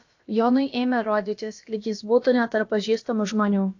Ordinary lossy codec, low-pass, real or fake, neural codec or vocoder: AAC, 48 kbps; 7.2 kHz; fake; codec, 16 kHz, about 1 kbps, DyCAST, with the encoder's durations